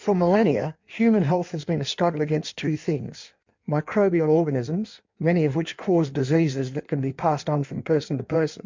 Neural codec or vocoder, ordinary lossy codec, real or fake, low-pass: codec, 16 kHz in and 24 kHz out, 1.1 kbps, FireRedTTS-2 codec; MP3, 64 kbps; fake; 7.2 kHz